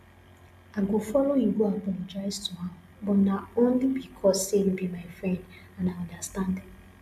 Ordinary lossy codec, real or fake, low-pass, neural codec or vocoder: none; real; 14.4 kHz; none